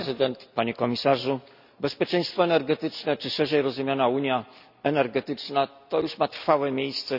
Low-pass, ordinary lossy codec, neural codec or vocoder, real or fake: 5.4 kHz; none; none; real